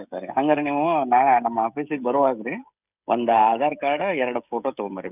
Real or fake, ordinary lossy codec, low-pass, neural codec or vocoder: fake; none; 3.6 kHz; codec, 16 kHz, 16 kbps, FreqCodec, smaller model